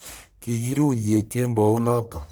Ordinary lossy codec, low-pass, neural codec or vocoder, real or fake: none; none; codec, 44.1 kHz, 1.7 kbps, Pupu-Codec; fake